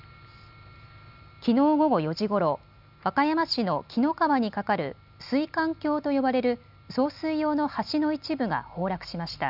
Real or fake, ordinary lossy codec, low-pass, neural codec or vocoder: real; none; 5.4 kHz; none